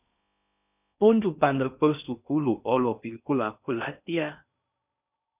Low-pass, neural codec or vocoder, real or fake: 3.6 kHz; codec, 16 kHz in and 24 kHz out, 0.6 kbps, FocalCodec, streaming, 4096 codes; fake